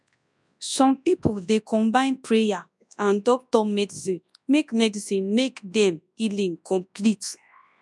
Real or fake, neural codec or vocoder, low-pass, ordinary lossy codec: fake; codec, 24 kHz, 0.9 kbps, WavTokenizer, large speech release; none; none